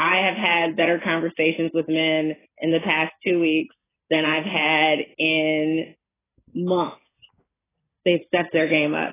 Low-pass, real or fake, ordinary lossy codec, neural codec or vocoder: 3.6 kHz; real; AAC, 16 kbps; none